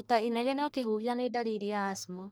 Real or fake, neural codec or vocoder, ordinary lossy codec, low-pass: fake; codec, 44.1 kHz, 1.7 kbps, Pupu-Codec; none; none